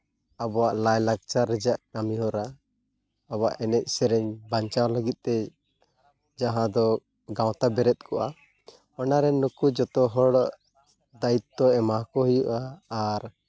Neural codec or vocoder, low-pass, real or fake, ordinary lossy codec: none; none; real; none